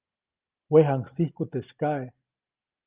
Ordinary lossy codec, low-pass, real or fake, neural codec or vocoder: Opus, 24 kbps; 3.6 kHz; real; none